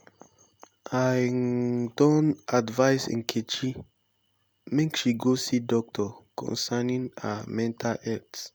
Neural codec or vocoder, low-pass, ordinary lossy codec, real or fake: none; none; none; real